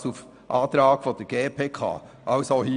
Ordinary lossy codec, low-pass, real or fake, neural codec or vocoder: none; 9.9 kHz; real; none